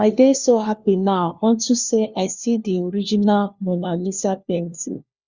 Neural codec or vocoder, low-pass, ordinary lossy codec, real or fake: codec, 16 kHz, 1 kbps, FunCodec, trained on LibriTTS, 50 frames a second; 7.2 kHz; Opus, 64 kbps; fake